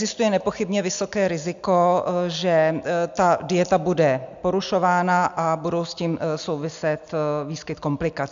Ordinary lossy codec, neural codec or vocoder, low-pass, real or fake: MP3, 64 kbps; none; 7.2 kHz; real